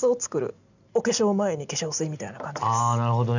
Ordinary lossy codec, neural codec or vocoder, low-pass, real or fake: none; none; 7.2 kHz; real